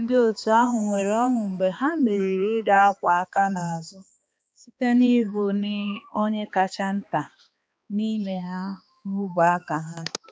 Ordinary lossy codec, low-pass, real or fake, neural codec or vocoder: none; none; fake; codec, 16 kHz, 2 kbps, X-Codec, HuBERT features, trained on balanced general audio